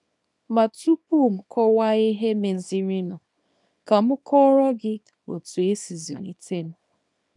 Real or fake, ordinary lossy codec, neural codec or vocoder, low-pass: fake; none; codec, 24 kHz, 0.9 kbps, WavTokenizer, small release; 10.8 kHz